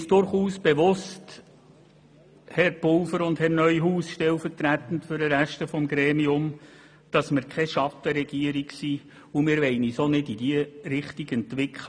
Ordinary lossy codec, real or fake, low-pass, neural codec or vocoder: none; real; 9.9 kHz; none